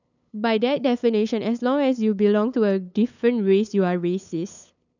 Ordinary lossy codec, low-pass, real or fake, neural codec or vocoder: none; 7.2 kHz; fake; codec, 16 kHz, 8 kbps, FunCodec, trained on LibriTTS, 25 frames a second